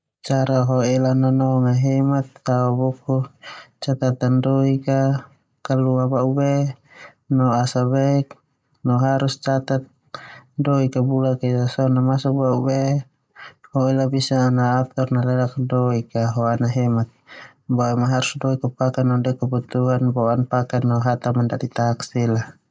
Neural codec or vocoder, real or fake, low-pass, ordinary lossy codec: none; real; none; none